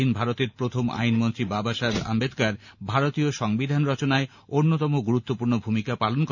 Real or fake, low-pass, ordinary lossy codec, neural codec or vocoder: real; 7.2 kHz; none; none